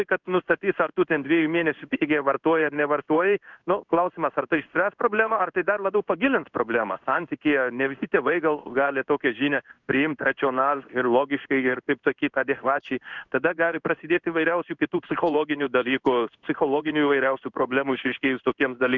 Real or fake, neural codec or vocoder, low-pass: fake; codec, 16 kHz in and 24 kHz out, 1 kbps, XY-Tokenizer; 7.2 kHz